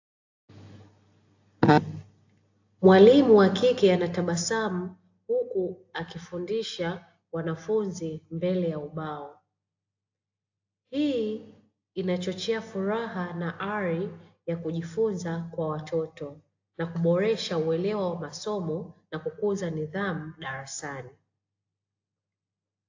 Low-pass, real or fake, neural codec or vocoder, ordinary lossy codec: 7.2 kHz; real; none; AAC, 48 kbps